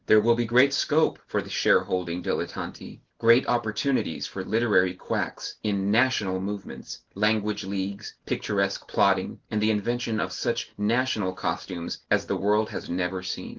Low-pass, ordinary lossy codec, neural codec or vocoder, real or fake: 7.2 kHz; Opus, 16 kbps; none; real